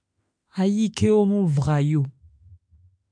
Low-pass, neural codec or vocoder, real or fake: 9.9 kHz; autoencoder, 48 kHz, 32 numbers a frame, DAC-VAE, trained on Japanese speech; fake